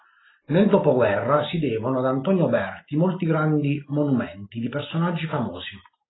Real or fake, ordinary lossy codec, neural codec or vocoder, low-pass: real; AAC, 16 kbps; none; 7.2 kHz